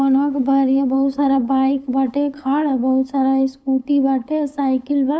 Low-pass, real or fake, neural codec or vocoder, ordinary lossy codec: none; fake; codec, 16 kHz, 8 kbps, FunCodec, trained on LibriTTS, 25 frames a second; none